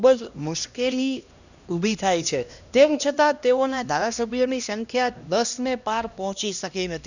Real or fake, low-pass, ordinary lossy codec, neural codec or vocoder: fake; 7.2 kHz; none; codec, 16 kHz, 1 kbps, X-Codec, HuBERT features, trained on LibriSpeech